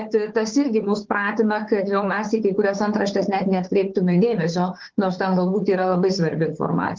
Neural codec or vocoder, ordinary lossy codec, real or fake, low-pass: codec, 16 kHz, 4 kbps, FunCodec, trained on Chinese and English, 50 frames a second; Opus, 16 kbps; fake; 7.2 kHz